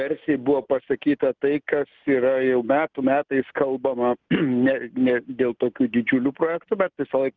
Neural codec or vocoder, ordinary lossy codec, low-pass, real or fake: none; Opus, 32 kbps; 7.2 kHz; real